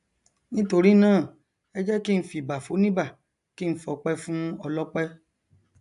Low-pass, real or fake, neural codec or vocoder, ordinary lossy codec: 10.8 kHz; real; none; AAC, 96 kbps